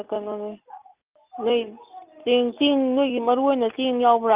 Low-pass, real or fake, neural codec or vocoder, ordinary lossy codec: 3.6 kHz; real; none; Opus, 32 kbps